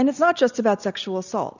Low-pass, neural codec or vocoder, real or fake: 7.2 kHz; none; real